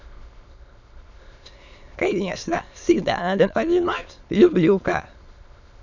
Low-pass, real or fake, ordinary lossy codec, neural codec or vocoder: 7.2 kHz; fake; none; autoencoder, 22.05 kHz, a latent of 192 numbers a frame, VITS, trained on many speakers